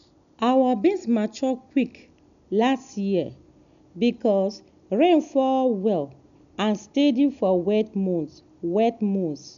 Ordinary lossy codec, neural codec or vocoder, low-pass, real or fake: none; none; 7.2 kHz; real